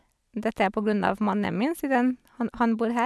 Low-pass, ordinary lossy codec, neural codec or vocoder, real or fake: none; none; none; real